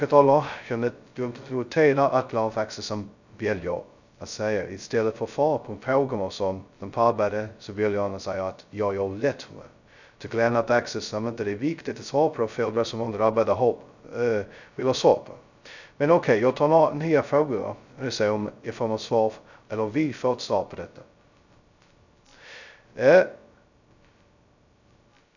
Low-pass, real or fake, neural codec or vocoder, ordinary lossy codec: 7.2 kHz; fake; codec, 16 kHz, 0.2 kbps, FocalCodec; none